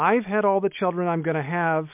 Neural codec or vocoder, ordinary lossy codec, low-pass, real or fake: none; MP3, 32 kbps; 3.6 kHz; real